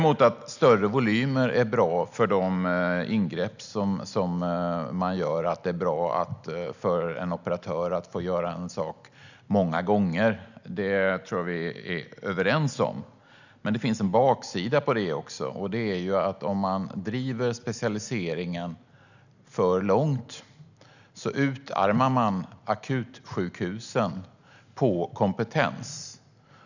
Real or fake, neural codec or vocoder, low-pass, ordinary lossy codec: real; none; 7.2 kHz; none